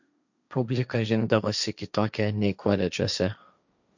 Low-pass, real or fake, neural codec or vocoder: 7.2 kHz; fake; codec, 16 kHz, 1.1 kbps, Voila-Tokenizer